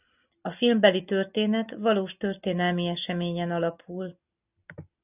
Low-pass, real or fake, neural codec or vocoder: 3.6 kHz; real; none